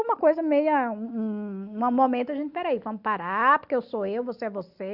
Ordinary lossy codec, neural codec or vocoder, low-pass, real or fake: Opus, 64 kbps; none; 5.4 kHz; real